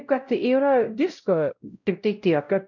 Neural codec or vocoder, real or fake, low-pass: codec, 16 kHz, 0.5 kbps, X-Codec, WavLM features, trained on Multilingual LibriSpeech; fake; 7.2 kHz